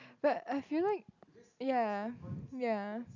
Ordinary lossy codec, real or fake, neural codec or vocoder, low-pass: none; real; none; 7.2 kHz